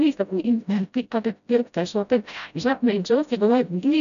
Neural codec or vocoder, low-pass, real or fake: codec, 16 kHz, 0.5 kbps, FreqCodec, smaller model; 7.2 kHz; fake